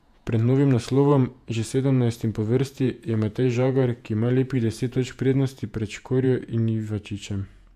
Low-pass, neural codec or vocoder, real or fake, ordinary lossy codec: 14.4 kHz; vocoder, 48 kHz, 128 mel bands, Vocos; fake; AAC, 96 kbps